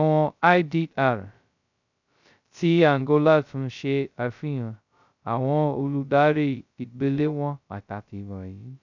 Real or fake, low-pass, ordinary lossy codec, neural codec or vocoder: fake; 7.2 kHz; none; codec, 16 kHz, 0.2 kbps, FocalCodec